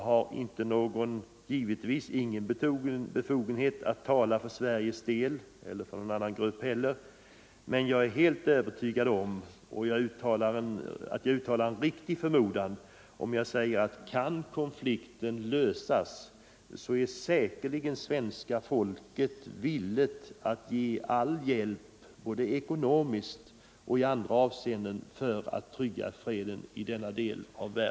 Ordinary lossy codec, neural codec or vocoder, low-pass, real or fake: none; none; none; real